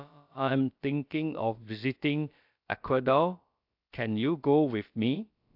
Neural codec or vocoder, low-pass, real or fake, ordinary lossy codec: codec, 16 kHz, about 1 kbps, DyCAST, with the encoder's durations; 5.4 kHz; fake; AAC, 48 kbps